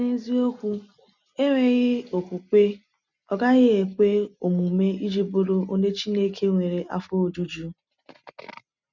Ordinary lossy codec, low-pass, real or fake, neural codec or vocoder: none; 7.2 kHz; real; none